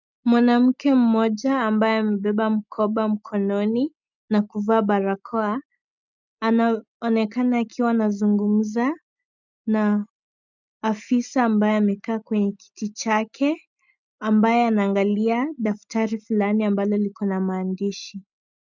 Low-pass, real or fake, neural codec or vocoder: 7.2 kHz; real; none